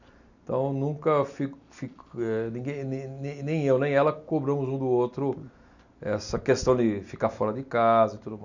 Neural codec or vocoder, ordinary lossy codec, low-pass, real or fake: none; none; 7.2 kHz; real